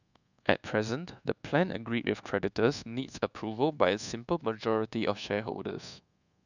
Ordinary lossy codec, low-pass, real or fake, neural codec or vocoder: none; 7.2 kHz; fake; codec, 24 kHz, 1.2 kbps, DualCodec